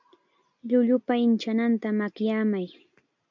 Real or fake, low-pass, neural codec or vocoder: real; 7.2 kHz; none